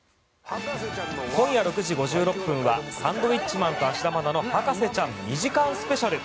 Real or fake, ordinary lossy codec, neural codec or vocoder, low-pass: real; none; none; none